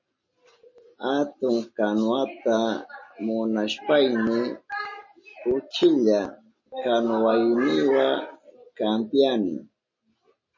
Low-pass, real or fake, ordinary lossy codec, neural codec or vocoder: 7.2 kHz; real; MP3, 32 kbps; none